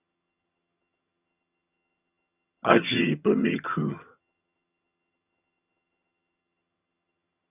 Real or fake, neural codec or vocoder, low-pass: fake; vocoder, 22.05 kHz, 80 mel bands, HiFi-GAN; 3.6 kHz